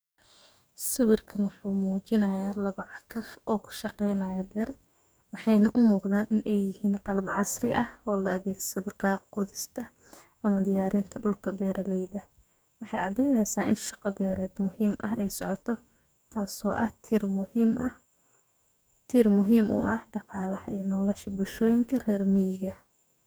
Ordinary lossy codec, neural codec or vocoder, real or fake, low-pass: none; codec, 44.1 kHz, 2.6 kbps, DAC; fake; none